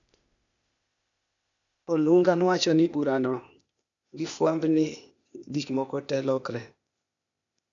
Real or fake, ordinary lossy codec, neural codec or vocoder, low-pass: fake; none; codec, 16 kHz, 0.8 kbps, ZipCodec; 7.2 kHz